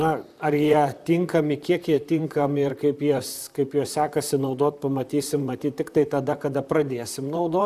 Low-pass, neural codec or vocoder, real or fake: 14.4 kHz; vocoder, 44.1 kHz, 128 mel bands, Pupu-Vocoder; fake